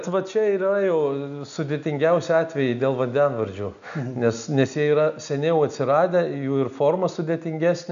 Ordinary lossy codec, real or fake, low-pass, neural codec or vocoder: AAC, 96 kbps; real; 7.2 kHz; none